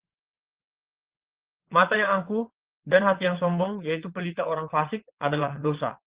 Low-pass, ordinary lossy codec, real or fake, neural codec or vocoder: 3.6 kHz; Opus, 16 kbps; fake; vocoder, 22.05 kHz, 80 mel bands, WaveNeXt